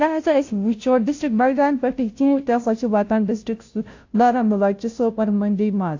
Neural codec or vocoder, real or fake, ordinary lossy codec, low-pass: codec, 16 kHz, 0.5 kbps, FunCodec, trained on Chinese and English, 25 frames a second; fake; AAC, 48 kbps; 7.2 kHz